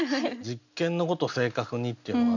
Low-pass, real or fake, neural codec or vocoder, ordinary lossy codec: 7.2 kHz; real; none; none